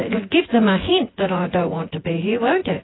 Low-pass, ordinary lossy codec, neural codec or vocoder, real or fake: 7.2 kHz; AAC, 16 kbps; vocoder, 24 kHz, 100 mel bands, Vocos; fake